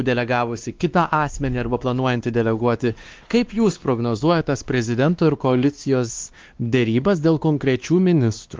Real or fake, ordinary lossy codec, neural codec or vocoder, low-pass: fake; Opus, 32 kbps; codec, 16 kHz, 2 kbps, X-Codec, WavLM features, trained on Multilingual LibriSpeech; 7.2 kHz